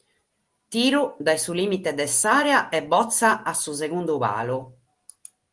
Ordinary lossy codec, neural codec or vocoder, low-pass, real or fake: Opus, 24 kbps; none; 10.8 kHz; real